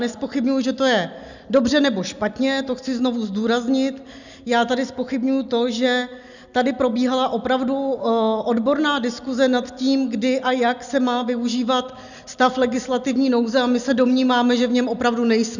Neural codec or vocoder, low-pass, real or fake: none; 7.2 kHz; real